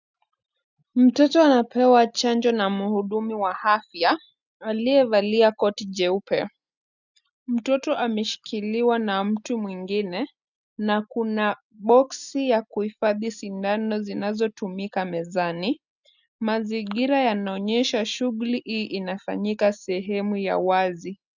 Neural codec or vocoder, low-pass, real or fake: none; 7.2 kHz; real